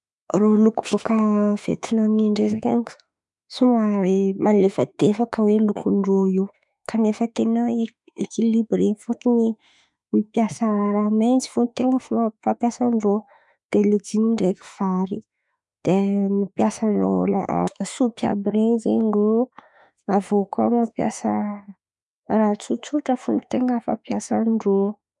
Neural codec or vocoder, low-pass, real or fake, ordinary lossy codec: autoencoder, 48 kHz, 32 numbers a frame, DAC-VAE, trained on Japanese speech; 10.8 kHz; fake; none